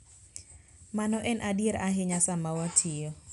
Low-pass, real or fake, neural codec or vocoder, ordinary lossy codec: 10.8 kHz; real; none; none